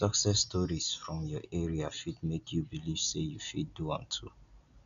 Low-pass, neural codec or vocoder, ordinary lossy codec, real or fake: 7.2 kHz; none; none; real